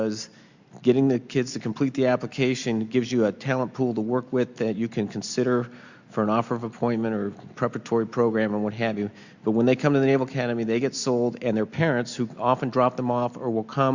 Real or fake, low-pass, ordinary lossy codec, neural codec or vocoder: real; 7.2 kHz; Opus, 64 kbps; none